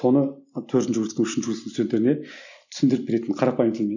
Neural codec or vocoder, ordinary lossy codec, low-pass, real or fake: none; none; 7.2 kHz; real